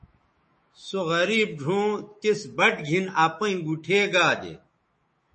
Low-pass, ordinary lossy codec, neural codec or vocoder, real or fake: 10.8 kHz; MP3, 32 kbps; autoencoder, 48 kHz, 128 numbers a frame, DAC-VAE, trained on Japanese speech; fake